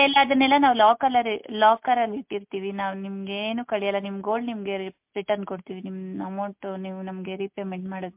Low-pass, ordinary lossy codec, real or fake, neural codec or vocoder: 3.6 kHz; MP3, 32 kbps; real; none